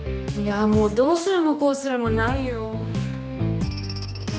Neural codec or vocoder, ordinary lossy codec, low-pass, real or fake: codec, 16 kHz, 2 kbps, X-Codec, HuBERT features, trained on general audio; none; none; fake